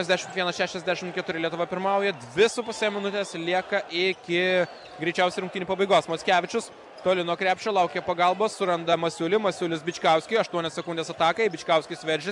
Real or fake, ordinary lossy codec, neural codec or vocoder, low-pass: real; MP3, 96 kbps; none; 10.8 kHz